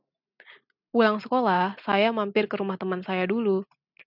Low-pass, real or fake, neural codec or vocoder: 5.4 kHz; real; none